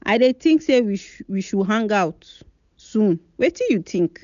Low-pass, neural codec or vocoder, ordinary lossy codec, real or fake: 7.2 kHz; none; none; real